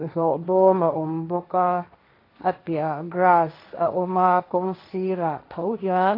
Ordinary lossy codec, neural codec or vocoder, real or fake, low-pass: AAC, 32 kbps; codec, 16 kHz, 1.1 kbps, Voila-Tokenizer; fake; 5.4 kHz